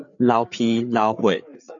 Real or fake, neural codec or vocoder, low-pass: fake; codec, 16 kHz, 8 kbps, FreqCodec, larger model; 7.2 kHz